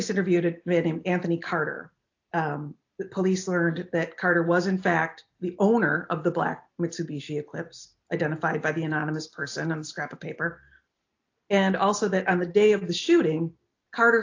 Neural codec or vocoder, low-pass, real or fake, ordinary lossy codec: none; 7.2 kHz; real; AAC, 48 kbps